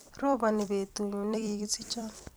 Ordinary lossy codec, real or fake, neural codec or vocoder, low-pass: none; fake; vocoder, 44.1 kHz, 128 mel bands every 256 samples, BigVGAN v2; none